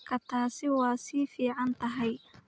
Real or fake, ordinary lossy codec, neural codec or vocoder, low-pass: real; none; none; none